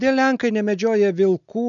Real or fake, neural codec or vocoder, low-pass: real; none; 7.2 kHz